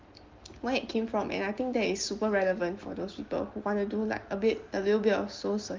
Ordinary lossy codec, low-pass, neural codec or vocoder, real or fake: Opus, 32 kbps; 7.2 kHz; none; real